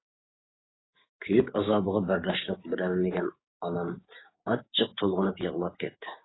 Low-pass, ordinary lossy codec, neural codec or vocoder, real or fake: 7.2 kHz; AAC, 16 kbps; codec, 16 kHz, 6 kbps, DAC; fake